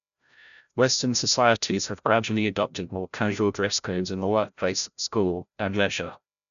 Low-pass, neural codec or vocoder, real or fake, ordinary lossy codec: 7.2 kHz; codec, 16 kHz, 0.5 kbps, FreqCodec, larger model; fake; none